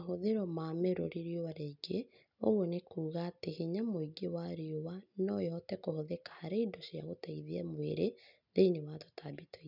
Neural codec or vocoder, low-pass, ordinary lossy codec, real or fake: none; 5.4 kHz; none; real